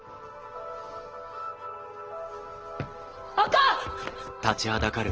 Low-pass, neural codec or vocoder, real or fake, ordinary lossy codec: 7.2 kHz; none; real; Opus, 16 kbps